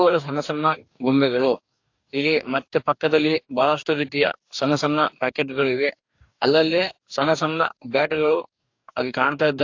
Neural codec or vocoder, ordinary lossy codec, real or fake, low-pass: codec, 44.1 kHz, 2.6 kbps, DAC; AAC, 48 kbps; fake; 7.2 kHz